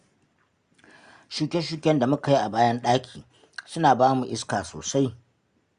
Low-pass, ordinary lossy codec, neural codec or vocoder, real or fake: 9.9 kHz; Opus, 64 kbps; none; real